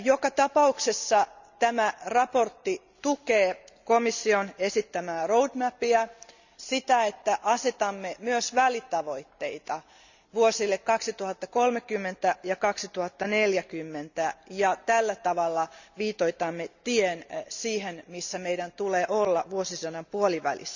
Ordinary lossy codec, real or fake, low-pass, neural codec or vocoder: none; real; 7.2 kHz; none